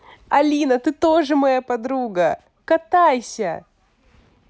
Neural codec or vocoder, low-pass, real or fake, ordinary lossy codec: none; none; real; none